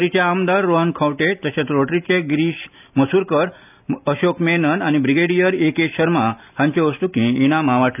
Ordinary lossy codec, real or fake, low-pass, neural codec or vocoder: none; real; 3.6 kHz; none